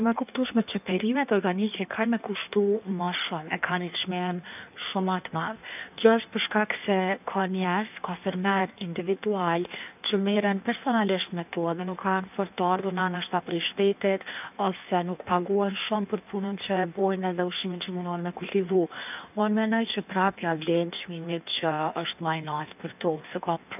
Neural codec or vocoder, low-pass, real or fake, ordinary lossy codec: codec, 16 kHz in and 24 kHz out, 1.1 kbps, FireRedTTS-2 codec; 3.6 kHz; fake; none